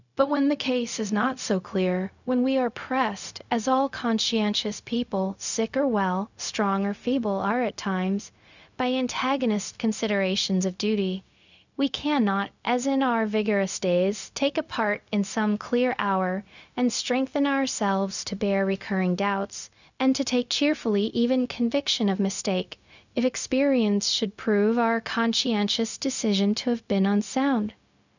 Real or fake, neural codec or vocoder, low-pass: fake; codec, 16 kHz, 0.4 kbps, LongCat-Audio-Codec; 7.2 kHz